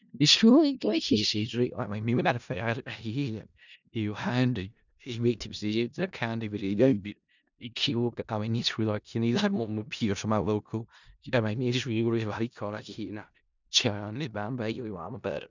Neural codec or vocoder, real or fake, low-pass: codec, 16 kHz in and 24 kHz out, 0.4 kbps, LongCat-Audio-Codec, four codebook decoder; fake; 7.2 kHz